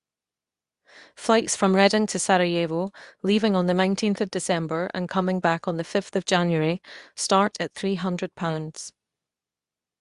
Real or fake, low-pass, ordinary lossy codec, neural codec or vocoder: fake; 10.8 kHz; Opus, 64 kbps; codec, 24 kHz, 0.9 kbps, WavTokenizer, medium speech release version 2